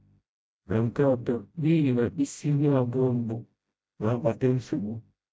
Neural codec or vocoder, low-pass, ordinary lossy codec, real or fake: codec, 16 kHz, 0.5 kbps, FreqCodec, smaller model; none; none; fake